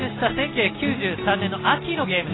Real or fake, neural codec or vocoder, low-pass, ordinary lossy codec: real; none; 7.2 kHz; AAC, 16 kbps